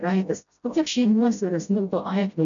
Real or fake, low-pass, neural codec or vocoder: fake; 7.2 kHz; codec, 16 kHz, 0.5 kbps, FreqCodec, smaller model